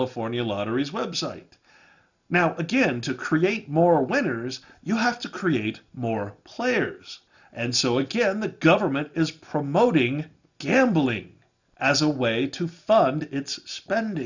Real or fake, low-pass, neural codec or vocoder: real; 7.2 kHz; none